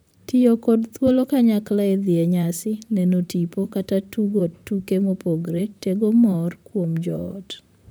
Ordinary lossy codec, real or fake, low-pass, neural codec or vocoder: none; fake; none; vocoder, 44.1 kHz, 128 mel bands, Pupu-Vocoder